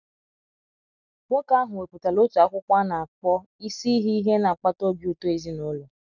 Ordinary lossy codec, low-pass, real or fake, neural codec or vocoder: none; 7.2 kHz; real; none